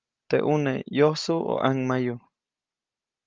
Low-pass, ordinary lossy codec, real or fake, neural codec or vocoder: 7.2 kHz; Opus, 24 kbps; real; none